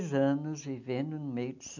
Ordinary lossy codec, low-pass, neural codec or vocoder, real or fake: MP3, 64 kbps; 7.2 kHz; none; real